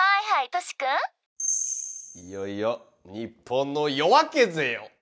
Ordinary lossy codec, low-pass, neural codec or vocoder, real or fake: none; none; none; real